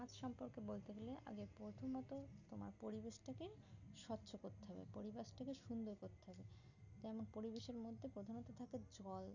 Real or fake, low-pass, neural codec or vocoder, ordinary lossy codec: real; 7.2 kHz; none; none